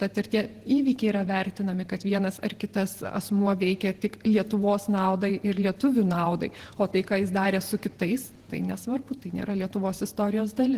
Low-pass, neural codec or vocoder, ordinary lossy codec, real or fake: 14.4 kHz; vocoder, 48 kHz, 128 mel bands, Vocos; Opus, 16 kbps; fake